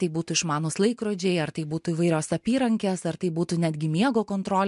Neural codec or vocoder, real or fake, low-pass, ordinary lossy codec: none; real; 10.8 kHz; MP3, 64 kbps